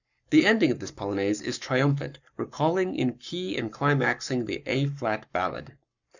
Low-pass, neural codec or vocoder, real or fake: 7.2 kHz; codec, 44.1 kHz, 7.8 kbps, Pupu-Codec; fake